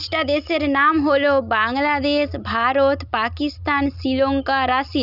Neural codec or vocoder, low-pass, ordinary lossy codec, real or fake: none; 5.4 kHz; none; real